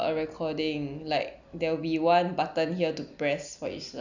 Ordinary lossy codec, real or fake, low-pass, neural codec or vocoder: none; real; 7.2 kHz; none